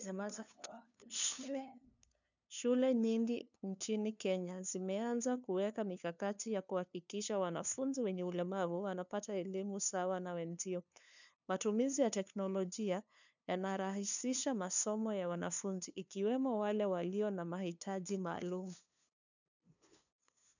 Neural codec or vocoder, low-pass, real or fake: codec, 16 kHz, 2 kbps, FunCodec, trained on LibriTTS, 25 frames a second; 7.2 kHz; fake